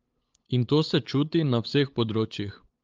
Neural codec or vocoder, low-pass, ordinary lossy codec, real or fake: codec, 16 kHz, 8 kbps, FunCodec, trained on LibriTTS, 25 frames a second; 7.2 kHz; Opus, 32 kbps; fake